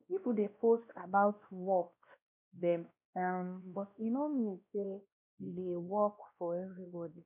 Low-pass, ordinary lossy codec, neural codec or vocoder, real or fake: 3.6 kHz; MP3, 32 kbps; codec, 16 kHz, 1 kbps, X-Codec, WavLM features, trained on Multilingual LibriSpeech; fake